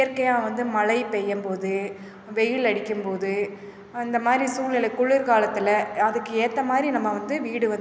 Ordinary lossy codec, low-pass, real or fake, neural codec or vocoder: none; none; real; none